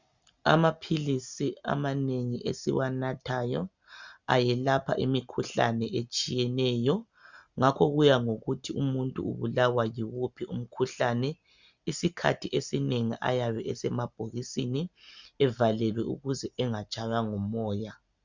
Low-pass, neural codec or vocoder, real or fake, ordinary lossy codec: 7.2 kHz; none; real; Opus, 64 kbps